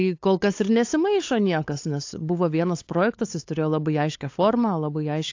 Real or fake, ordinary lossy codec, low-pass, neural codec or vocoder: fake; AAC, 48 kbps; 7.2 kHz; codec, 16 kHz, 8 kbps, FunCodec, trained on LibriTTS, 25 frames a second